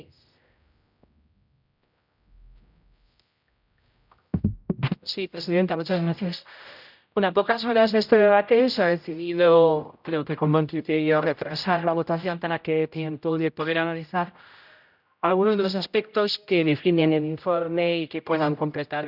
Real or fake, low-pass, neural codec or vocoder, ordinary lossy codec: fake; 5.4 kHz; codec, 16 kHz, 0.5 kbps, X-Codec, HuBERT features, trained on general audio; none